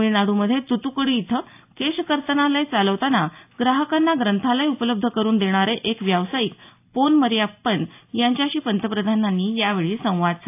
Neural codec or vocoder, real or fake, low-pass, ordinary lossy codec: none; real; 3.6 kHz; AAC, 32 kbps